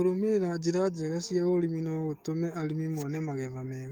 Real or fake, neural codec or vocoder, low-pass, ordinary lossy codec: fake; codec, 44.1 kHz, 7.8 kbps, DAC; 19.8 kHz; Opus, 32 kbps